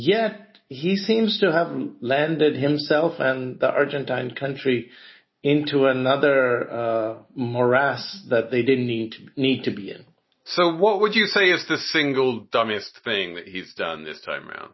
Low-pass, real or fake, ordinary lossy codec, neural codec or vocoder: 7.2 kHz; real; MP3, 24 kbps; none